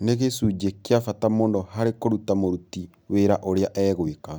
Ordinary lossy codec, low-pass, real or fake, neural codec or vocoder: none; none; real; none